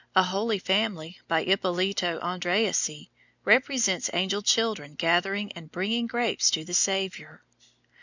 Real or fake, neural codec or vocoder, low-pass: real; none; 7.2 kHz